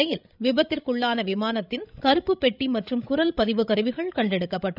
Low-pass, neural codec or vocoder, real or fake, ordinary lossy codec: 5.4 kHz; codec, 16 kHz, 16 kbps, FreqCodec, larger model; fake; none